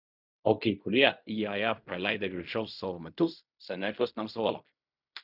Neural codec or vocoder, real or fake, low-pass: codec, 16 kHz in and 24 kHz out, 0.4 kbps, LongCat-Audio-Codec, fine tuned four codebook decoder; fake; 5.4 kHz